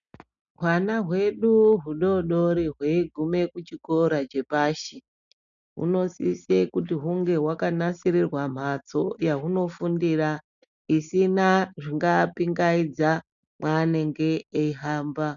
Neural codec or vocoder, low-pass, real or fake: none; 7.2 kHz; real